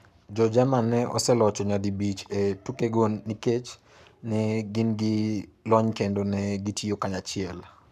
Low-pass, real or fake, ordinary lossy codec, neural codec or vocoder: 14.4 kHz; fake; none; codec, 44.1 kHz, 7.8 kbps, Pupu-Codec